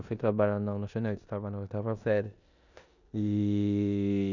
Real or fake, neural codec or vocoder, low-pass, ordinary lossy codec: fake; codec, 16 kHz in and 24 kHz out, 0.9 kbps, LongCat-Audio-Codec, four codebook decoder; 7.2 kHz; none